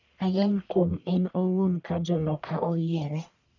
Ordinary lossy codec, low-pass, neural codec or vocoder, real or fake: none; 7.2 kHz; codec, 44.1 kHz, 1.7 kbps, Pupu-Codec; fake